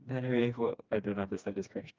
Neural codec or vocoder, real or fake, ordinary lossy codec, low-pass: codec, 16 kHz, 2 kbps, FreqCodec, smaller model; fake; Opus, 32 kbps; 7.2 kHz